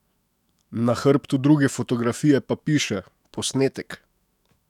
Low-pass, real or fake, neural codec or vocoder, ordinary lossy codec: 19.8 kHz; fake; autoencoder, 48 kHz, 128 numbers a frame, DAC-VAE, trained on Japanese speech; none